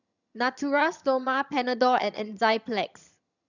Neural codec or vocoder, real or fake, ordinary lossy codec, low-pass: vocoder, 22.05 kHz, 80 mel bands, HiFi-GAN; fake; none; 7.2 kHz